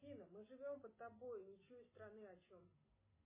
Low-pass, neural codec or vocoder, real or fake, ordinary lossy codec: 3.6 kHz; none; real; MP3, 16 kbps